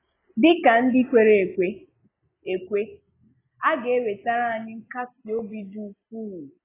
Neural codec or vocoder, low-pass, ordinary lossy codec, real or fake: none; 3.6 kHz; AAC, 16 kbps; real